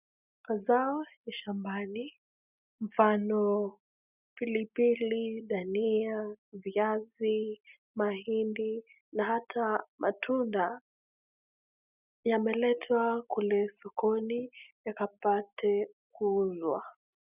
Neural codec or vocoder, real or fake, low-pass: none; real; 3.6 kHz